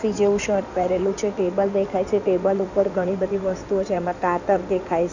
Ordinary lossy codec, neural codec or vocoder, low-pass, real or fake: none; codec, 16 kHz in and 24 kHz out, 2.2 kbps, FireRedTTS-2 codec; 7.2 kHz; fake